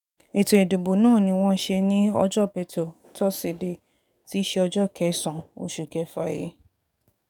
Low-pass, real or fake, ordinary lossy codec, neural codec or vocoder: 19.8 kHz; fake; none; codec, 44.1 kHz, 7.8 kbps, DAC